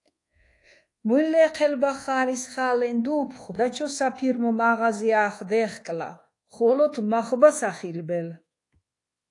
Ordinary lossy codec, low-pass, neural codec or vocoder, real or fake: AAC, 48 kbps; 10.8 kHz; codec, 24 kHz, 1.2 kbps, DualCodec; fake